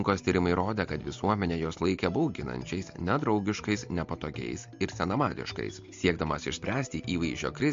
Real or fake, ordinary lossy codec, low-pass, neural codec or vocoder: real; MP3, 48 kbps; 7.2 kHz; none